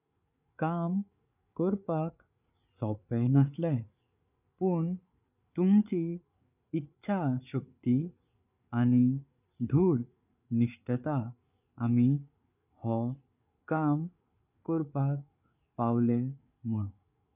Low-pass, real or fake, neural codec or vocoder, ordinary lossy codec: 3.6 kHz; fake; codec, 16 kHz, 8 kbps, FreqCodec, larger model; none